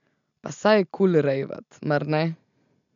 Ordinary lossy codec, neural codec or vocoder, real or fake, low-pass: MP3, 64 kbps; none; real; 7.2 kHz